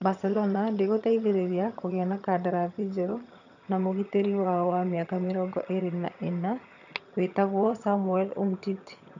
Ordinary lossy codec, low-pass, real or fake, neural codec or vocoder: none; 7.2 kHz; fake; vocoder, 22.05 kHz, 80 mel bands, HiFi-GAN